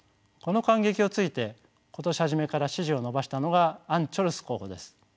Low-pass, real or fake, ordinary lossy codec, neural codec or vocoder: none; real; none; none